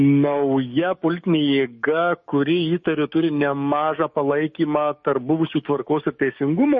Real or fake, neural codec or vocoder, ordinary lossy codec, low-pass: fake; codec, 16 kHz, 6 kbps, DAC; MP3, 32 kbps; 7.2 kHz